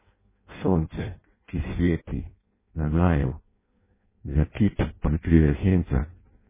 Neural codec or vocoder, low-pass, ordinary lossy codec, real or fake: codec, 16 kHz in and 24 kHz out, 0.6 kbps, FireRedTTS-2 codec; 3.6 kHz; MP3, 16 kbps; fake